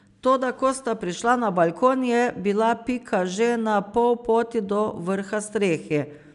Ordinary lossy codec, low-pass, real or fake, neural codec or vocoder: none; 10.8 kHz; real; none